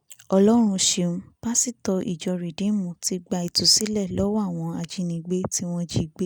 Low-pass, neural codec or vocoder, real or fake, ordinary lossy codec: none; none; real; none